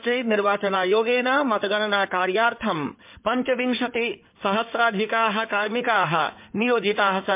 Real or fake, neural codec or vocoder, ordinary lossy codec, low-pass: fake; codec, 16 kHz in and 24 kHz out, 2.2 kbps, FireRedTTS-2 codec; MP3, 32 kbps; 3.6 kHz